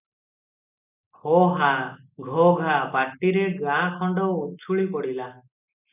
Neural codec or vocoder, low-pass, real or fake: none; 3.6 kHz; real